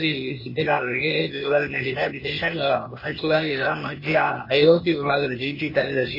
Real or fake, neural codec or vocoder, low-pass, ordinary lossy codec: fake; codec, 16 kHz, 0.8 kbps, ZipCodec; 5.4 kHz; MP3, 24 kbps